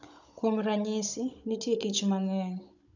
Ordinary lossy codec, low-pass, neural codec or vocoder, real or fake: none; 7.2 kHz; codec, 16 kHz, 16 kbps, FunCodec, trained on Chinese and English, 50 frames a second; fake